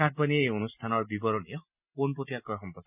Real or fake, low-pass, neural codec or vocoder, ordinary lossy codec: real; 3.6 kHz; none; none